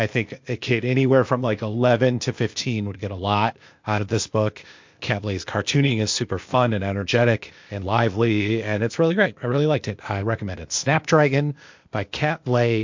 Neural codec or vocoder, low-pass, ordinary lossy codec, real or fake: codec, 16 kHz, 0.8 kbps, ZipCodec; 7.2 kHz; MP3, 48 kbps; fake